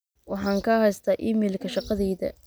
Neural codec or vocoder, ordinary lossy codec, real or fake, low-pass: none; none; real; none